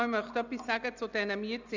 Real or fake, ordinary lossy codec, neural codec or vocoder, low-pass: real; none; none; 7.2 kHz